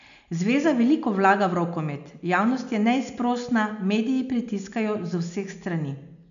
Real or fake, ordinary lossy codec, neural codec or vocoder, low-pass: real; none; none; 7.2 kHz